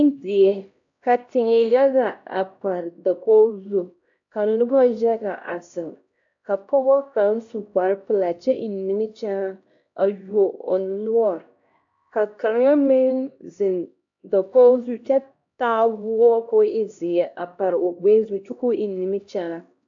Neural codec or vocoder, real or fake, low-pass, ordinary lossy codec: codec, 16 kHz, 1 kbps, X-Codec, HuBERT features, trained on LibriSpeech; fake; 7.2 kHz; AAC, 64 kbps